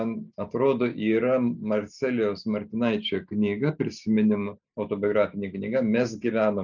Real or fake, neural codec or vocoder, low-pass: real; none; 7.2 kHz